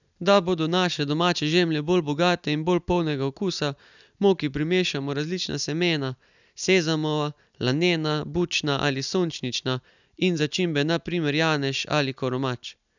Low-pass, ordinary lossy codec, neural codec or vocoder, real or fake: 7.2 kHz; none; none; real